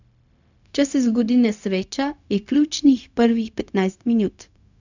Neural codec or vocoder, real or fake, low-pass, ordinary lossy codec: codec, 16 kHz, 0.4 kbps, LongCat-Audio-Codec; fake; 7.2 kHz; none